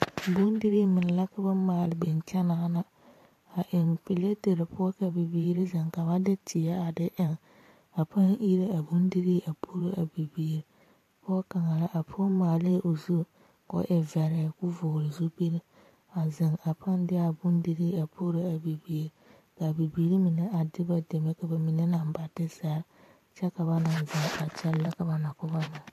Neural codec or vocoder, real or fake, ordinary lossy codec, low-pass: vocoder, 44.1 kHz, 128 mel bands every 512 samples, BigVGAN v2; fake; AAC, 64 kbps; 14.4 kHz